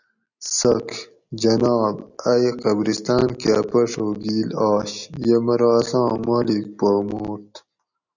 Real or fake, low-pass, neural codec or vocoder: real; 7.2 kHz; none